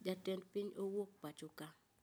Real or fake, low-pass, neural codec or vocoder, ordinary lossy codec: real; none; none; none